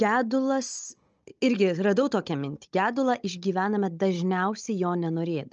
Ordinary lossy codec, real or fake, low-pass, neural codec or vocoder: Opus, 24 kbps; fake; 7.2 kHz; codec, 16 kHz, 16 kbps, FunCodec, trained on Chinese and English, 50 frames a second